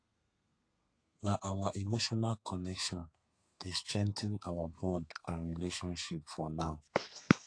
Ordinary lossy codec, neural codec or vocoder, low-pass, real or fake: AAC, 48 kbps; codec, 32 kHz, 1.9 kbps, SNAC; 9.9 kHz; fake